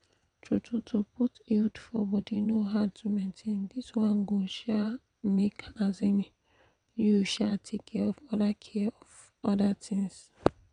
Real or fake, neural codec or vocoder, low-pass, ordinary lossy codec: fake; vocoder, 22.05 kHz, 80 mel bands, WaveNeXt; 9.9 kHz; none